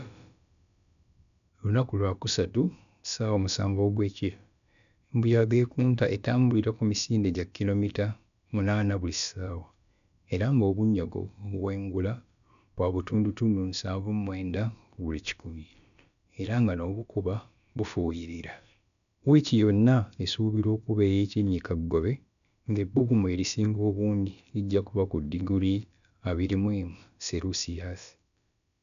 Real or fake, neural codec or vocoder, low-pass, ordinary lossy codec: fake; codec, 16 kHz, about 1 kbps, DyCAST, with the encoder's durations; 7.2 kHz; none